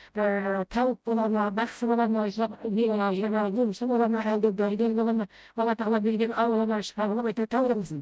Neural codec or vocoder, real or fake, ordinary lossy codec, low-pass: codec, 16 kHz, 0.5 kbps, FreqCodec, smaller model; fake; none; none